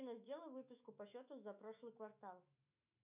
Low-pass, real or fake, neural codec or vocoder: 3.6 kHz; fake; autoencoder, 48 kHz, 128 numbers a frame, DAC-VAE, trained on Japanese speech